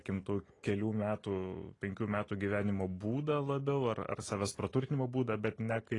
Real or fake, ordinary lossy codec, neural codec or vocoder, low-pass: real; AAC, 32 kbps; none; 10.8 kHz